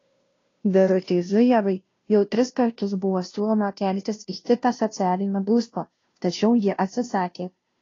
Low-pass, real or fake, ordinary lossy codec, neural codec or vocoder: 7.2 kHz; fake; AAC, 32 kbps; codec, 16 kHz, 0.5 kbps, FunCodec, trained on Chinese and English, 25 frames a second